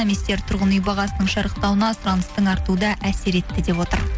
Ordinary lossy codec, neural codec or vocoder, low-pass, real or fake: none; none; none; real